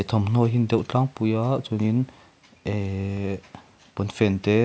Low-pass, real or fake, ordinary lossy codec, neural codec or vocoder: none; real; none; none